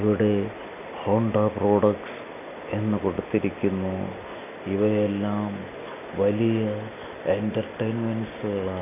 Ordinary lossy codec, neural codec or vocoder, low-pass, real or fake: none; none; 3.6 kHz; real